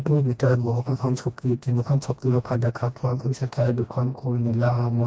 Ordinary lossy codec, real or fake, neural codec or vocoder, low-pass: none; fake; codec, 16 kHz, 1 kbps, FreqCodec, smaller model; none